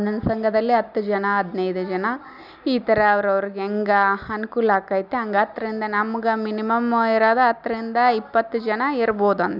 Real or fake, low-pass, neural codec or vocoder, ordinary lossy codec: real; 5.4 kHz; none; none